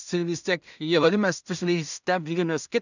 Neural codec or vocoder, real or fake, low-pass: codec, 16 kHz in and 24 kHz out, 0.4 kbps, LongCat-Audio-Codec, two codebook decoder; fake; 7.2 kHz